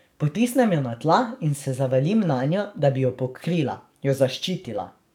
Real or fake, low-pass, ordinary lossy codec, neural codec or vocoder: fake; 19.8 kHz; none; codec, 44.1 kHz, 7.8 kbps, DAC